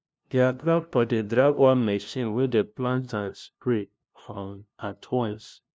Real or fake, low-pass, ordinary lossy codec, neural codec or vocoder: fake; none; none; codec, 16 kHz, 0.5 kbps, FunCodec, trained on LibriTTS, 25 frames a second